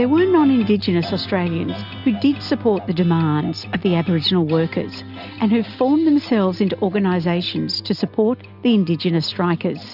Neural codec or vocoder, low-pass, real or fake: none; 5.4 kHz; real